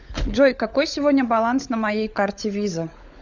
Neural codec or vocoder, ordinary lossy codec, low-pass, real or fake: codec, 16 kHz, 16 kbps, FunCodec, trained on LibriTTS, 50 frames a second; Opus, 64 kbps; 7.2 kHz; fake